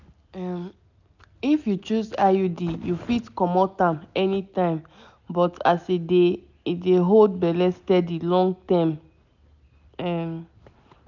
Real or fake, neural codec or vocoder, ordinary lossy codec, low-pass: real; none; none; 7.2 kHz